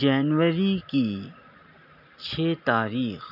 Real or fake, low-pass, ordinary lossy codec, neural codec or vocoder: real; 5.4 kHz; none; none